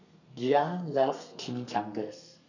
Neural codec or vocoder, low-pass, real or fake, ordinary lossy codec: codec, 32 kHz, 1.9 kbps, SNAC; 7.2 kHz; fake; none